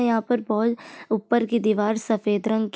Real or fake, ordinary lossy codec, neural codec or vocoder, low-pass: real; none; none; none